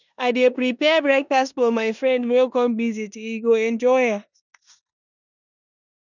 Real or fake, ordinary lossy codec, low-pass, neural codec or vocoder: fake; none; 7.2 kHz; codec, 16 kHz in and 24 kHz out, 0.9 kbps, LongCat-Audio-Codec, four codebook decoder